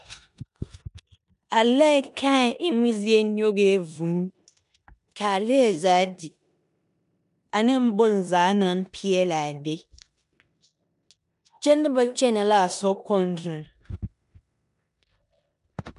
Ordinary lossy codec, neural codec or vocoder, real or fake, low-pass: MP3, 96 kbps; codec, 16 kHz in and 24 kHz out, 0.9 kbps, LongCat-Audio-Codec, four codebook decoder; fake; 10.8 kHz